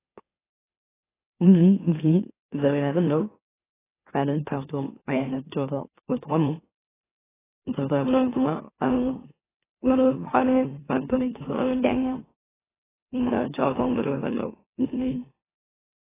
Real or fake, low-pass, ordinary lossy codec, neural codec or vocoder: fake; 3.6 kHz; AAC, 16 kbps; autoencoder, 44.1 kHz, a latent of 192 numbers a frame, MeloTTS